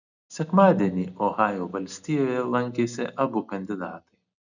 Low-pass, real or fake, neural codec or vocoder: 7.2 kHz; real; none